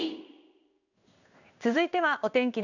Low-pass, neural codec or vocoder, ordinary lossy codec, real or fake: 7.2 kHz; codec, 16 kHz in and 24 kHz out, 1 kbps, XY-Tokenizer; none; fake